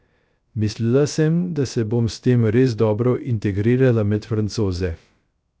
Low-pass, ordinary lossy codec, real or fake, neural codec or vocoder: none; none; fake; codec, 16 kHz, 0.3 kbps, FocalCodec